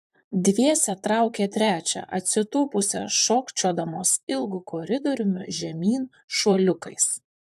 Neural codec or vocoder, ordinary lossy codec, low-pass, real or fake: vocoder, 44.1 kHz, 128 mel bands, Pupu-Vocoder; AAC, 96 kbps; 14.4 kHz; fake